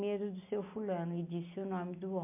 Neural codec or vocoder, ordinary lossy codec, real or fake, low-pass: none; MP3, 24 kbps; real; 3.6 kHz